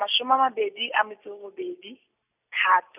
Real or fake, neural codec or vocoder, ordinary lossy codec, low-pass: real; none; none; 3.6 kHz